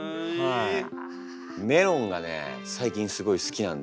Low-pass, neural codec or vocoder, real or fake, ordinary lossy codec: none; none; real; none